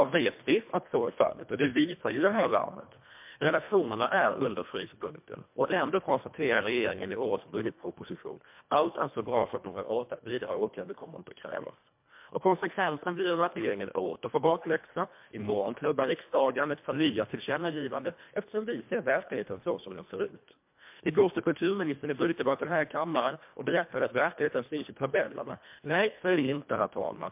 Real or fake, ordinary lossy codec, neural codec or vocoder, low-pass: fake; MP3, 32 kbps; codec, 24 kHz, 1.5 kbps, HILCodec; 3.6 kHz